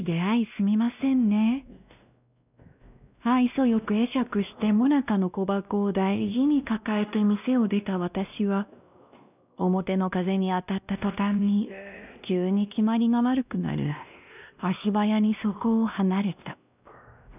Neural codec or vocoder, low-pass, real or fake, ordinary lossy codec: codec, 16 kHz, 1 kbps, X-Codec, WavLM features, trained on Multilingual LibriSpeech; 3.6 kHz; fake; none